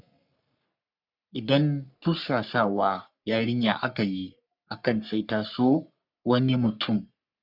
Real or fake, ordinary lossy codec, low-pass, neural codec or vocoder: fake; none; 5.4 kHz; codec, 44.1 kHz, 3.4 kbps, Pupu-Codec